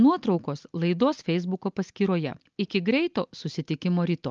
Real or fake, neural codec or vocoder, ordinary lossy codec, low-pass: real; none; Opus, 24 kbps; 7.2 kHz